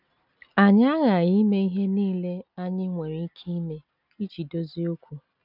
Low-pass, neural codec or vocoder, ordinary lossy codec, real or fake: 5.4 kHz; none; none; real